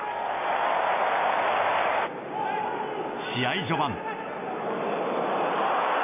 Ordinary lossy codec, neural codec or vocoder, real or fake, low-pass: MP3, 24 kbps; none; real; 3.6 kHz